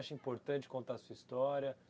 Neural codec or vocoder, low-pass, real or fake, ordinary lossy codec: none; none; real; none